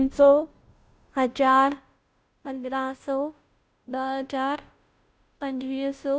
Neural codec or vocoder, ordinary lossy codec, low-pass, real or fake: codec, 16 kHz, 0.5 kbps, FunCodec, trained on Chinese and English, 25 frames a second; none; none; fake